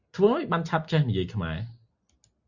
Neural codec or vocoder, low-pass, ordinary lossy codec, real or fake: none; 7.2 kHz; Opus, 64 kbps; real